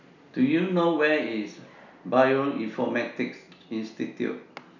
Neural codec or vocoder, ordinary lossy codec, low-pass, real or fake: none; none; 7.2 kHz; real